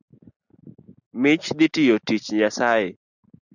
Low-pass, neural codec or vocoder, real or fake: 7.2 kHz; none; real